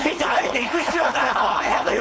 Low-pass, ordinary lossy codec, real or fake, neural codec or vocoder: none; none; fake; codec, 16 kHz, 4.8 kbps, FACodec